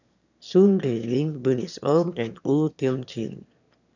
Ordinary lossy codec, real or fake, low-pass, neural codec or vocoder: none; fake; 7.2 kHz; autoencoder, 22.05 kHz, a latent of 192 numbers a frame, VITS, trained on one speaker